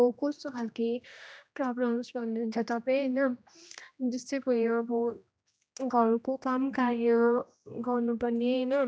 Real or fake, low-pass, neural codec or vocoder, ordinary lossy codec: fake; none; codec, 16 kHz, 1 kbps, X-Codec, HuBERT features, trained on general audio; none